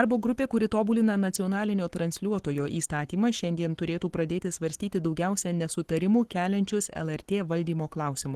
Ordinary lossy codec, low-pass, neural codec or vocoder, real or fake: Opus, 16 kbps; 14.4 kHz; codec, 44.1 kHz, 7.8 kbps, Pupu-Codec; fake